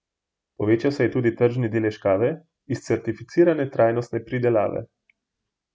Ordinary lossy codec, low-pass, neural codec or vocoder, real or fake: none; none; none; real